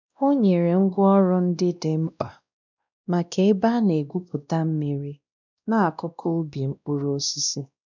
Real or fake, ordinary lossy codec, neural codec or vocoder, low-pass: fake; none; codec, 16 kHz, 1 kbps, X-Codec, WavLM features, trained on Multilingual LibriSpeech; 7.2 kHz